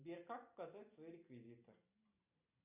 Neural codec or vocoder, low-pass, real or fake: none; 3.6 kHz; real